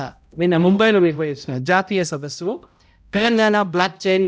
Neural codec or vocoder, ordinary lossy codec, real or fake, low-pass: codec, 16 kHz, 0.5 kbps, X-Codec, HuBERT features, trained on balanced general audio; none; fake; none